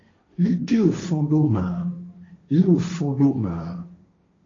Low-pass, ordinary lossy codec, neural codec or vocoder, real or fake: 7.2 kHz; AAC, 32 kbps; codec, 16 kHz, 1.1 kbps, Voila-Tokenizer; fake